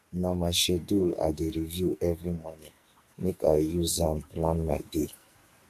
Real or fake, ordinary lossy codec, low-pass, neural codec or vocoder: fake; AAC, 96 kbps; 14.4 kHz; codec, 44.1 kHz, 2.6 kbps, SNAC